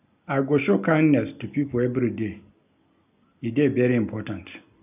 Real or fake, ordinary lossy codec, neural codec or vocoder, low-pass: real; none; none; 3.6 kHz